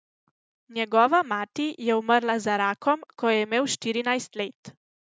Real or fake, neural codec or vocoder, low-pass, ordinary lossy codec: real; none; none; none